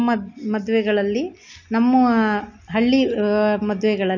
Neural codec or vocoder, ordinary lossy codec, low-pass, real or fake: none; none; 7.2 kHz; real